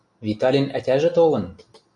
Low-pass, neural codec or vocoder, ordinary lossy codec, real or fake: 10.8 kHz; none; MP3, 96 kbps; real